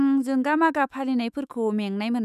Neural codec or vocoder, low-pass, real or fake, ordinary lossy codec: autoencoder, 48 kHz, 128 numbers a frame, DAC-VAE, trained on Japanese speech; 14.4 kHz; fake; none